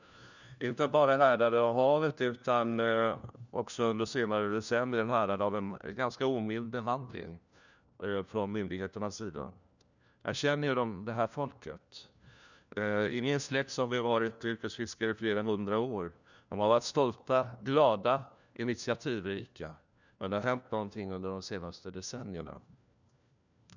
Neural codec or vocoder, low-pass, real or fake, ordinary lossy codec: codec, 16 kHz, 1 kbps, FunCodec, trained on LibriTTS, 50 frames a second; 7.2 kHz; fake; none